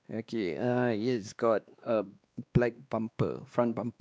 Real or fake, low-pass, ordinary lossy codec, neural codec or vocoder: fake; none; none; codec, 16 kHz, 2 kbps, X-Codec, WavLM features, trained on Multilingual LibriSpeech